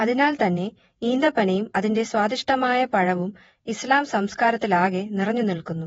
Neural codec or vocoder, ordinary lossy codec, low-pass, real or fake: vocoder, 48 kHz, 128 mel bands, Vocos; AAC, 24 kbps; 19.8 kHz; fake